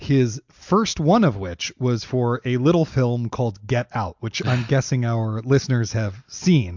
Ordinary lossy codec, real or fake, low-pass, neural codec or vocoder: MP3, 64 kbps; real; 7.2 kHz; none